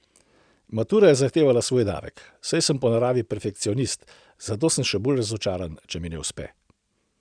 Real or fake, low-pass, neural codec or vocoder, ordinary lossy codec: real; 9.9 kHz; none; none